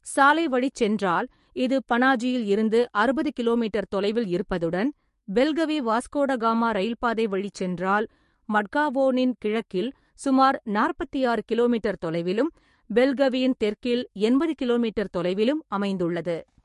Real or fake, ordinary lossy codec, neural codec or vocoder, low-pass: fake; MP3, 48 kbps; codec, 44.1 kHz, 7.8 kbps, DAC; 14.4 kHz